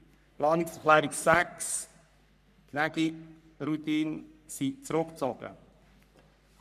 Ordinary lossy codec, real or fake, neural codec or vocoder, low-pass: none; fake; codec, 44.1 kHz, 3.4 kbps, Pupu-Codec; 14.4 kHz